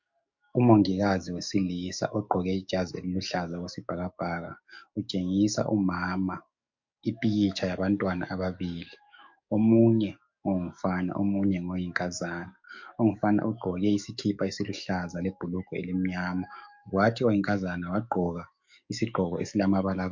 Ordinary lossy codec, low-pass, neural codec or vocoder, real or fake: MP3, 48 kbps; 7.2 kHz; codec, 44.1 kHz, 7.8 kbps, DAC; fake